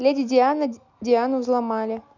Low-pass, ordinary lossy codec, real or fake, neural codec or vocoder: 7.2 kHz; none; real; none